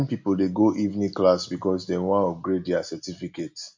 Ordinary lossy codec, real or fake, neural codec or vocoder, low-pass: MP3, 48 kbps; real; none; 7.2 kHz